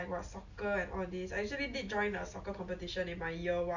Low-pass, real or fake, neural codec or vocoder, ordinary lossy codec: 7.2 kHz; real; none; none